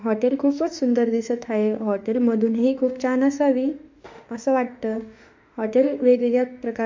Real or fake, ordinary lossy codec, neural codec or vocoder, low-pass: fake; MP3, 64 kbps; autoencoder, 48 kHz, 32 numbers a frame, DAC-VAE, trained on Japanese speech; 7.2 kHz